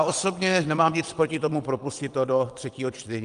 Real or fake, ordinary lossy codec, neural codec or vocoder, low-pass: fake; Opus, 32 kbps; vocoder, 22.05 kHz, 80 mel bands, WaveNeXt; 9.9 kHz